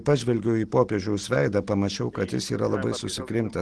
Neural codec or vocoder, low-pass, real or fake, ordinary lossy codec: none; 10.8 kHz; real; Opus, 16 kbps